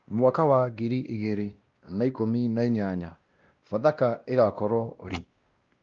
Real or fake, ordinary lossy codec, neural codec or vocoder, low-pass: fake; Opus, 32 kbps; codec, 16 kHz, 1 kbps, X-Codec, WavLM features, trained on Multilingual LibriSpeech; 7.2 kHz